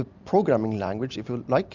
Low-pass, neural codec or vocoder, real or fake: 7.2 kHz; none; real